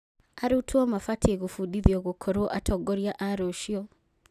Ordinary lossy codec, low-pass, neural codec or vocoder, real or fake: none; 14.4 kHz; none; real